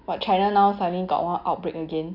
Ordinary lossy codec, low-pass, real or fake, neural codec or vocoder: none; 5.4 kHz; real; none